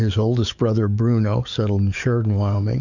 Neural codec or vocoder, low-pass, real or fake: codec, 44.1 kHz, 7.8 kbps, DAC; 7.2 kHz; fake